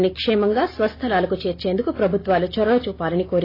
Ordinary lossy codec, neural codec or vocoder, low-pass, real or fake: AAC, 24 kbps; none; 5.4 kHz; real